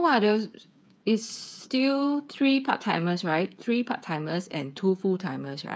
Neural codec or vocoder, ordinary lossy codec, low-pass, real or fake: codec, 16 kHz, 8 kbps, FreqCodec, smaller model; none; none; fake